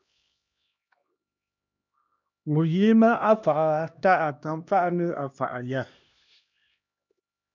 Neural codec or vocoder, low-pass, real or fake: codec, 16 kHz, 1 kbps, X-Codec, HuBERT features, trained on LibriSpeech; 7.2 kHz; fake